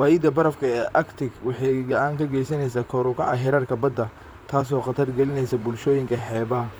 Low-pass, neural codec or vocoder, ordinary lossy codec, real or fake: none; vocoder, 44.1 kHz, 128 mel bands, Pupu-Vocoder; none; fake